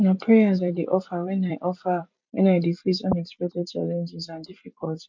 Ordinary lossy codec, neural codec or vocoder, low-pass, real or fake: MP3, 64 kbps; vocoder, 44.1 kHz, 128 mel bands every 256 samples, BigVGAN v2; 7.2 kHz; fake